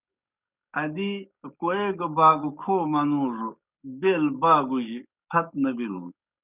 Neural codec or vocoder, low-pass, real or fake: codec, 44.1 kHz, 7.8 kbps, DAC; 3.6 kHz; fake